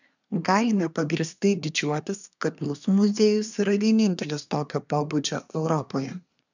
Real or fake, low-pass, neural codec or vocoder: fake; 7.2 kHz; codec, 24 kHz, 1 kbps, SNAC